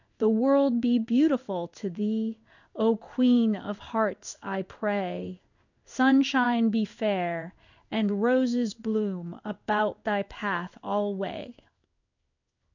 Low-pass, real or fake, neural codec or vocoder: 7.2 kHz; fake; codec, 16 kHz in and 24 kHz out, 1 kbps, XY-Tokenizer